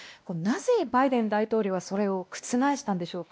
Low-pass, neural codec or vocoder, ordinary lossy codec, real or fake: none; codec, 16 kHz, 1 kbps, X-Codec, WavLM features, trained on Multilingual LibriSpeech; none; fake